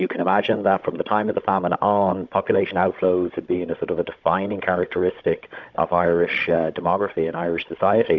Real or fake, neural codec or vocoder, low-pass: fake; codec, 16 kHz, 16 kbps, FunCodec, trained on Chinese and English, 50 frames a second; 7.2 kHz